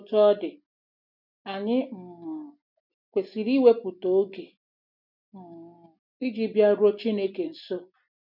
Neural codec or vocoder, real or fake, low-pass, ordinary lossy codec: none; real; 5.4 kHz; none